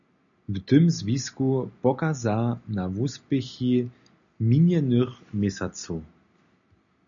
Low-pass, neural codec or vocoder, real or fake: 7.2 kHz; none; real